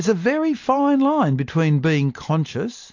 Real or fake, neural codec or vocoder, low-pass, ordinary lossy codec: real; none; 7.2 kHz; AAC, 48 kbps